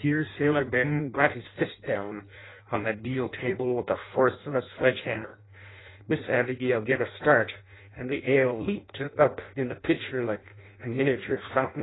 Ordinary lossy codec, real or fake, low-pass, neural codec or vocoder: AAC, 16 kbps; fake; 7.2 kHz; codec, 16 kHz in and 24 kHz out, 0.6 kbps, FireRedTTS-2 codec